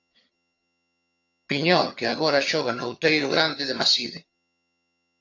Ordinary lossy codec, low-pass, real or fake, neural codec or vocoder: AAC, 48 kbps; 7.2 kHz; fake; vocoder, 22.05 kHz, 80 mel bands, HiFi-GAN